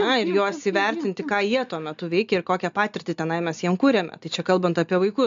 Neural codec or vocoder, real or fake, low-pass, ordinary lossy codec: none; real; 7.2 kHz; AAC, 64 kbps